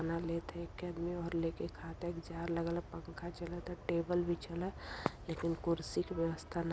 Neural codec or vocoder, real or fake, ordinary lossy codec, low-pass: none; real; none; none